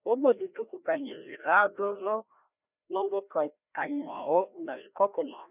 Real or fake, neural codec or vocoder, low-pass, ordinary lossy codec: fake; codec, 16 kHz, 1 kbps, FreqCodec, larger model; 3.6 kHz; none